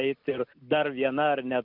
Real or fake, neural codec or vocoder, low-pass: real; none; 5.4 kHz